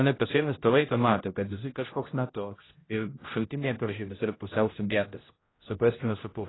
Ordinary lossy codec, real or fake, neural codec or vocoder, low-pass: AAC, 16 kbps; fake; codec, 16 kHz, 0.5 kbps, X-Codec, HuBERT features, trained on general audio; 7.2 kHz